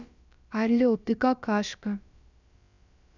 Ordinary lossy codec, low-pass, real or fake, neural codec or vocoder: none; 7.2 kHz; fake; codec, 16 kHz, about 1 kbps, DyCAST, with the encoder's durations